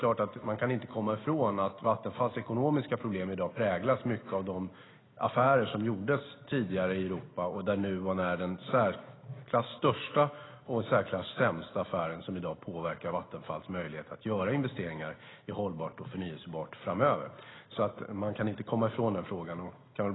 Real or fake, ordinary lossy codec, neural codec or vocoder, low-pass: real; AAC, 16 kbps; none; 7.2 kHz